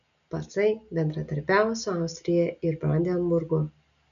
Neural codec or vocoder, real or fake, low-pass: none; real; 7.2 kHz